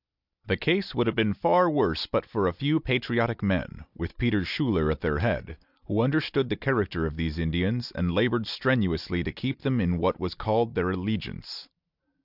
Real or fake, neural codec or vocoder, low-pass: real; none; 5.4 kHz